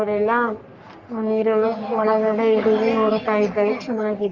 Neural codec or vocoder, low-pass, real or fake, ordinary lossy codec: codec, 44.1 kHz, 3.4 kbps, Pupu-Codec; 7.2 kHz; fake; Opus, 24 kbps